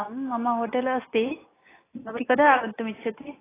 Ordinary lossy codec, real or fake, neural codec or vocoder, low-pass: AAC, 16 kbps; real; none; 3.6 kHz